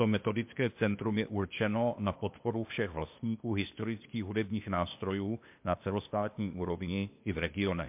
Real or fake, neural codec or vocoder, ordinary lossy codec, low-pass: fake; codec, 16 kHz, 0.8 kbps, ZipCodec; MP3, 32 kbps; 3.6 kHz